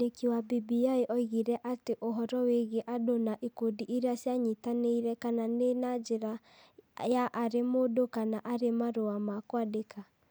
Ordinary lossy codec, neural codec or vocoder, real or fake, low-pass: none; none; real; none